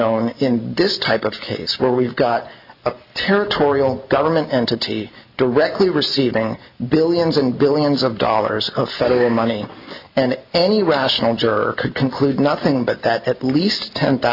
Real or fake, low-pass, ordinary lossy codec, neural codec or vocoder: real; 5.4 kHz; Opus, 64 kbps; none